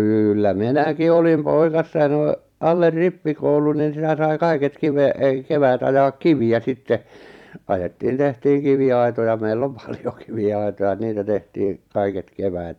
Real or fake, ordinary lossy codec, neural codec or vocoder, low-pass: fake; none; vocoder, 44.1 kHz, 128 mel bands every 256 samples, BigVGAN v2; 19.8 kHz